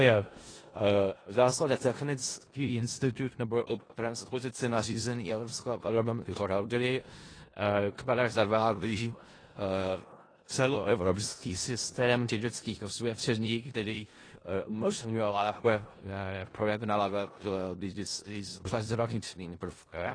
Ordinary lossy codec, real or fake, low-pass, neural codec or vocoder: AAC, 32 kbps; fake; 9.9 kHz; codec, 16 kHz in and 24 kHz out, 0.4 kbps, LongCat-Audio-Codec, four codebook decoder